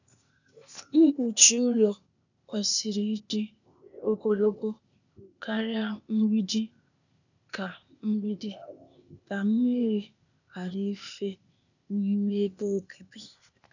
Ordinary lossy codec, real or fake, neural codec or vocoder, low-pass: none; fake; codec, 16 kHz, 0.8 kbps, ZipCodec; 7.2 kHz